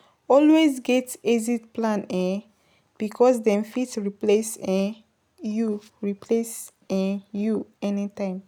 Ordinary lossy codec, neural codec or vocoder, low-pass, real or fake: none; none; none; real